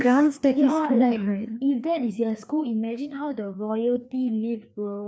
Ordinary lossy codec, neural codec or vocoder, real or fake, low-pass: none; codec, 16 kHz, 2 kbps, FreqCodec, larger model; fake; none